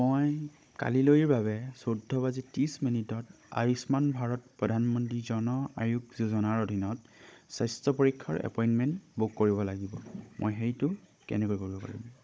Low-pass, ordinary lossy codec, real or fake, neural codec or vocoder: none; none; fake; codec, 16 kHz, 16 kbps, FunCodec, trained on Chinese and English, 50 frames a second